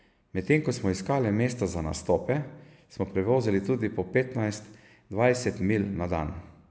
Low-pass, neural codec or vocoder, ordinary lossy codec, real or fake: none; none; none; real